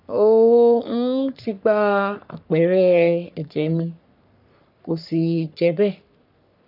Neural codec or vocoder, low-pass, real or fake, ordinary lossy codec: codec, 44.1 kHz, 3.4 kbps, Pupu-Codec; 5.4 kHz; fake; none